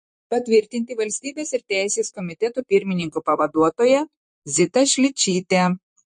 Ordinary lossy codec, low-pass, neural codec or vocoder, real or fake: MP3, 48 kbps; 10.8 kHz; vocoder, 44.1 kHz, 128 mel bands, Pupu-Vocoder; fake